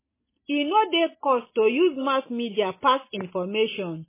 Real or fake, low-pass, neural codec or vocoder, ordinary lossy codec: real; 3.6 kHz; none; MP3, 16 kbps